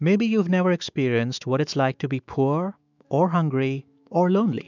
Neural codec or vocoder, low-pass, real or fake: autoencoder, 48 kHz, 128 numbers a frame, DAC-VAE, trained on Japanese speech; 7.2 kHz; fake